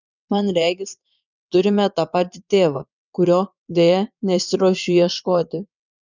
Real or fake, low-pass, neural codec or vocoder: fake; 7.2 kHz; vocoder, 22.05 kHz, 80 mel bands, WaveNeXt